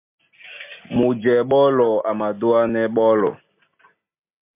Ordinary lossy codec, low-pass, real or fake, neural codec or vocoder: MP3, 24 kbps; 3.6 kHz; real; none